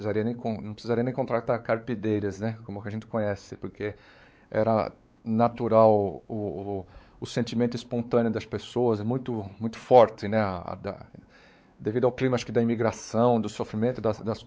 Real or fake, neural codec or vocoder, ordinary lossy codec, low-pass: fake; codec, 16 kHz, 4 kbps, X-Codec, WavLM features, trained on Multilingual LibriSpeech; none; none